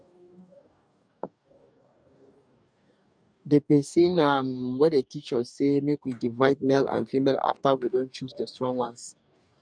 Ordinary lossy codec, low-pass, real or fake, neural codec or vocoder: none; 9.9 kHz; fake; codec, 44.1 kHz, 2.6 kbps, DAC